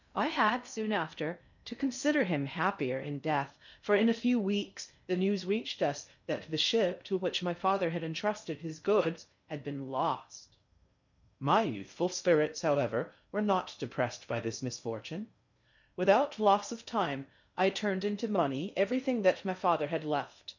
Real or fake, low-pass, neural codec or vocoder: fake; 7.2 kHz; codec, 16 kHz in and 24 kHz out, 0.6 kbps, FocalCodec, streaming, 2048 codes